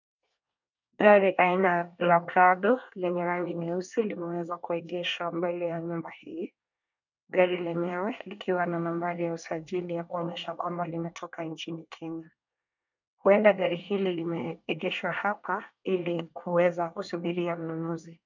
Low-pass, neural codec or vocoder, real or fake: 7.2 kHz; codec, 24 kHz, 1 kbps, SNAC; fake